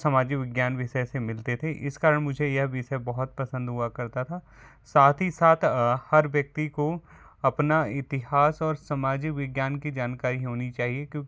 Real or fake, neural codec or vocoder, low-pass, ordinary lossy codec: real; none; none; none